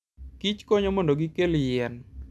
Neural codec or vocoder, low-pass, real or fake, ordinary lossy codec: none; none; real; none